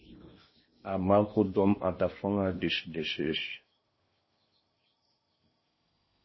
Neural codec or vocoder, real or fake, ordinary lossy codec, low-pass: codec, 16 kHz in and 24 kHz out, 0.8 kbps, FocalCodec, streaming, 65536 codes; fake; MP3, 24 kbps; 7.2 kHz